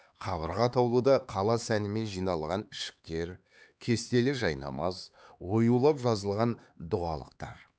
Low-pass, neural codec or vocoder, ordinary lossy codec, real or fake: none; codec, 16 kHz, 4 kbps, X-Codec, HuBERT features, trained on LibriSpeech; none; fake